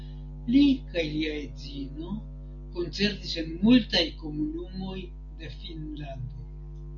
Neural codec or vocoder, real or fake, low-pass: none; real; 7.2 kHz